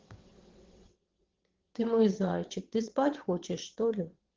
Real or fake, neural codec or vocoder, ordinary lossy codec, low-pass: fake; vocoder, 22.05 kHz, 80 mel bands, WaveNeXt; Opus, 16 kbps; 7.2 kHz